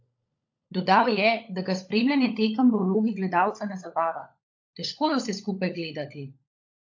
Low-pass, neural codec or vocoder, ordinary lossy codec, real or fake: 7.2 kHz; codec, 16 kHz, 16 kbps, FunCodec, trained on LibriTTS, 50 frames a second; AAC, 48 kbps; fake